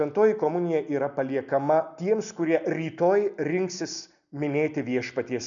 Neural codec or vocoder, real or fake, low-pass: none; real; 7.2 kHz